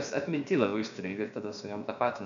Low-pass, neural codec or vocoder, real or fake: 7.2 kHz; codec, 16 kHz, about 1 kbps, DyCAST, with the encoder's durations; fake